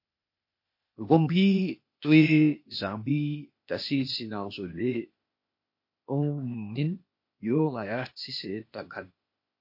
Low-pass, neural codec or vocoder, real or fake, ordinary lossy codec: 5.4 kHz; codec, 16 kHz, 0.8 kbps, ZipCodec; fake; MP3, 32 kbps